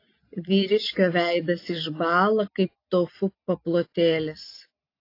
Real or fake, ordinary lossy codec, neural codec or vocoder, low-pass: real; AAC, 32 kbps; none; 5.4 kHz